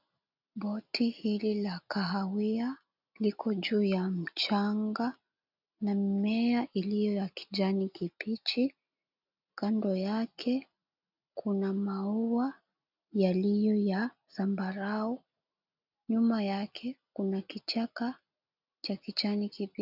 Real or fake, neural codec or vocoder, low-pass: real; none; 5.4 kHz